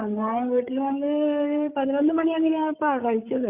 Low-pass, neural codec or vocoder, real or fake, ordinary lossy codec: 3.6 kHz; codec, 16 kHz, 8 kbps, FreqCodec, larger model; fake; Opus, 64 kbps